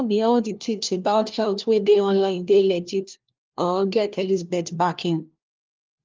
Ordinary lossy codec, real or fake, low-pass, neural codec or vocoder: Opus, 32 kbps; fake; 7.2 kHz; codec, 16 kHz, 1 kbps, FunCodec, trained on LibriTTS, 50 frames a second